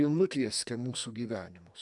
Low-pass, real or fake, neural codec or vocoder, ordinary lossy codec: 10.8 kHz; fake; codec, 44.1 kHz, 2.6 kbps, SNAC; MP3, 96 kbps